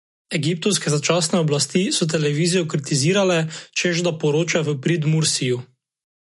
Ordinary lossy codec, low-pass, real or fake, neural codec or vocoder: MP3, 48 kbps; 14.4 kHz; real; none